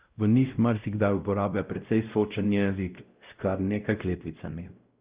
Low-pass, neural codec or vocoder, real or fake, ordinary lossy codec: 3.6 kHz; codec, 16 kHz, 0.5 kbps, X-Codec, WavLM features, trained on Multilingual LibriSpeech; fake; Opus, 24 kbps